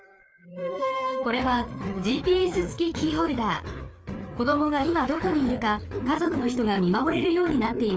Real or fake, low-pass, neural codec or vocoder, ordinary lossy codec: fake; none; codec, 16 kHz, 4 kbps, FreqCodec, larger model; none